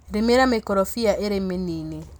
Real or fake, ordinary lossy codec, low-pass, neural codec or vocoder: real; none; none; none